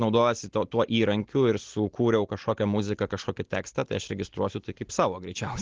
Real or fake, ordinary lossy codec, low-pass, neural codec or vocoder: real; Opus, 16 kbps; 7.2 kHz; none